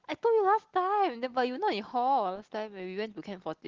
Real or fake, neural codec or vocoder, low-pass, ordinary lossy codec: real; none; 7.2 kHz; Opus, 16 kbps